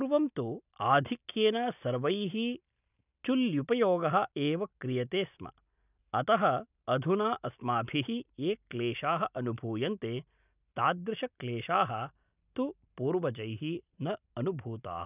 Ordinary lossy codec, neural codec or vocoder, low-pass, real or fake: none; none; 3.6 kHz; real